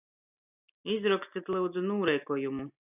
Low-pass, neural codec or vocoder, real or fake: 3.6 kHz; none; real